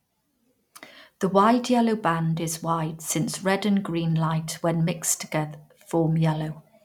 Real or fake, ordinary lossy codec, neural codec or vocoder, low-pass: real; none; none; 19.8 kHz